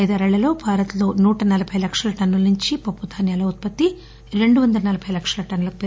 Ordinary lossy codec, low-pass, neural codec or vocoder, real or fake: none; none; none; real